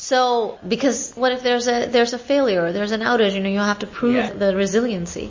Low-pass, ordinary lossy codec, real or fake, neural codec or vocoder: 7.2 kHz; MP3, 32 kbps; real; none